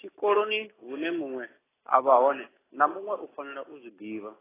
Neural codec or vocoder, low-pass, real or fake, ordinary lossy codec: none; 3.6 kHz; real; AAC, 16 kbps